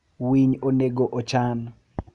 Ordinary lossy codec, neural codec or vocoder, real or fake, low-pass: none; none; real; 10.8 kHz